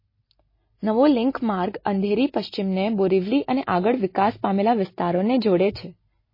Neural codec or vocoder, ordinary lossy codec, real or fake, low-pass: none; MP3, 24 kbps; real; 5.4 kHz